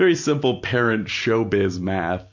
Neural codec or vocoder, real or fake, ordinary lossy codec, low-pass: none; real; MP3, 48 kbps; 7.2 kHz